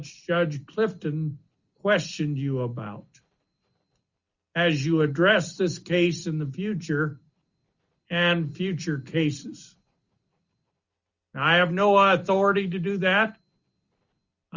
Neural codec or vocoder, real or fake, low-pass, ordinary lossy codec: none; real; 7.2 kHz; Opus, 64 kbps